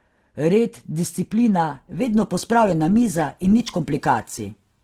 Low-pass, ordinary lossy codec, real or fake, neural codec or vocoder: 19.8 kHz; Opus, 16 kbps; real; none